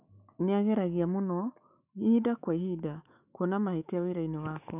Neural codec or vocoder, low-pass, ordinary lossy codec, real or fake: autoencoder, 48 kHz, 128 numbers a frame, DAC-VAE, trained on Japanese speech; 3.6 kHz; none; fake